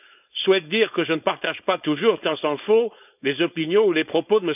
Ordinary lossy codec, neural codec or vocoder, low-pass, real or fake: none; codec, 16 kHz, 4.8 kbps, FACodec; 3.6 kHz; fake